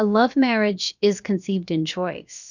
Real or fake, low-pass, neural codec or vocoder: fake; 7.2 kHz; codec, 16 kHz, about 1 kbps, DyCAST, with the encoder's durations